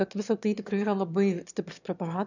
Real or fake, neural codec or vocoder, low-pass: fake; autoencoder, 22.05 kHz, a latent of 192 numbers a frame, VITS, trained on one speaker; 7.2 kHz